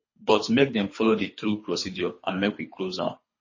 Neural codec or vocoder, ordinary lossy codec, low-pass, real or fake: codec, 24 kHz, 3 kbps, HILCodec; MP3, 32 kbps; 7.2 kHz; fake